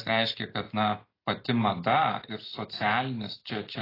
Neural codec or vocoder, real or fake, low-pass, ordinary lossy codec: none; real; 5.4 kHz; AAC, 24 kbps